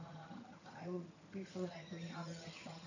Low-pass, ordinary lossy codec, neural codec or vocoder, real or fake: 7.2 kHz; none; vocoder, 22.05 kHz, 80 mel bands, HiFi-GAN; fake